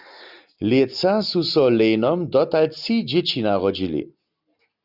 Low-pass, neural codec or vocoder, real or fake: 5.4 kHz; none; real